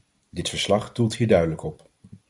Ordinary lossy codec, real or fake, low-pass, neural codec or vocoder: MP3, 96 kbps; real; 10.8 kHz; none